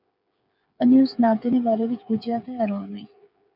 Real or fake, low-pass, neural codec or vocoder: fake; 5.4 kHz; codec, 16 kHz, 8 kbps, FreqCodec, smaller model